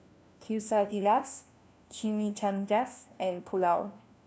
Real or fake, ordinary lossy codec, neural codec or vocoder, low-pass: fake; none; codec, 16 kHz, 1 kbps, FunCodec, trained on LibriTTS, 50 frames a second; none